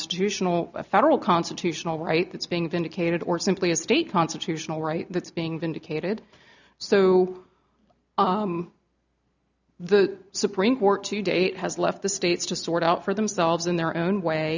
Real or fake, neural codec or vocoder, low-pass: real; none; 7.2 kHz